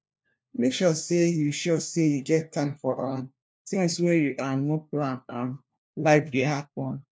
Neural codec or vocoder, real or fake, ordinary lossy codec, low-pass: codec, 16 kHz, 1 kbps, FunCodec, trained on LibriTTS, 50 frames a second; fake; none; none